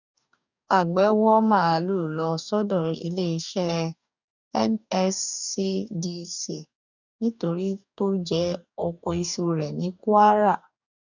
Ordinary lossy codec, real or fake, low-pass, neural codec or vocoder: none; fake; 7.2 kHz; codec, 44.1 kHz, 2.6 kbps, DAC